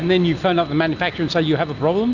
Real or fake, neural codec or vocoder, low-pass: real; none; 7.2 kHz